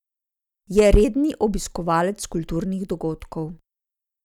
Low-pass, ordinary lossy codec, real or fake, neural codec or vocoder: 19.8 kHz; none; real; none